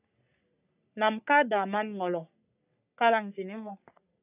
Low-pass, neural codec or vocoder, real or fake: 3.6 kHz; codec, 44.1 kHz, 3.4 kbps, Pupu-Codec; fake